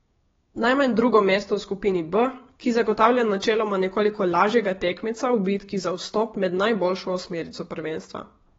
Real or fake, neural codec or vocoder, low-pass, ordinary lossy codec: fake; codec, 16 kHz, 6 kbps, DAC; 7.2 kHz; AAC, 24 kbps